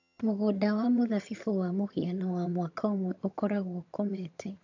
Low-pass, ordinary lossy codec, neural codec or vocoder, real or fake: 7.2 kHz; AAC, 48 kbps; vocoder, 22.05 kHz, 80 mel bands, HiFi-GAN; fake